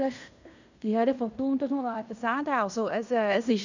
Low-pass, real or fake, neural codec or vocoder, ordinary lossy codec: 7.2 kHz; fake; codec, 16 kHz in and 24 kHz out, 0.9 kbps, LongCat-Audio-Codec, fine tuned four codebook decoder; none